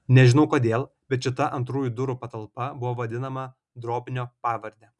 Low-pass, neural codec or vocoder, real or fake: 10.8 kHz; none; real